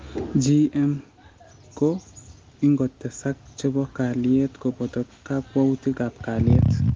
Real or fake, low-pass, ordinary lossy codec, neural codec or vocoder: real; 7.2 kHz; Opus, 32 kbps; none